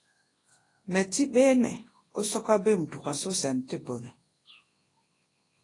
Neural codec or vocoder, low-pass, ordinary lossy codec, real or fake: codec, 24 kHz, 0.9 kbps, WavTokenizer, large speech release; 10.8 kHz; AAC, 32 kbps; fake